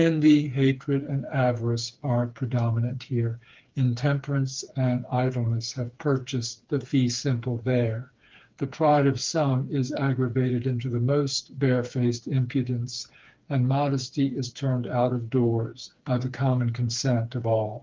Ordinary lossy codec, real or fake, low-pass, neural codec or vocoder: Opus, 32 kbps; fake; 7.2 kHz; codec, 16 kHz, 4 kbps, FreqCodec, smaller model